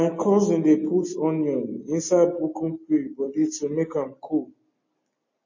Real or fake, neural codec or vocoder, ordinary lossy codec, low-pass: real; none; MP3, 32 kbps; 7.2 kHz